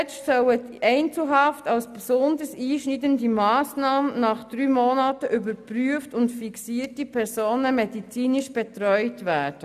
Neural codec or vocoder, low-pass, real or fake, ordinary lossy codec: none; 14.4 kHz; real; none